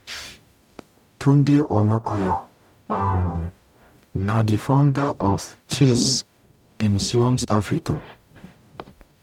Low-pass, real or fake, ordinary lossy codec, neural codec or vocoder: 19.8 kHz; fake; none; codec, 44.1 kHz, 0.9 kbps, DAC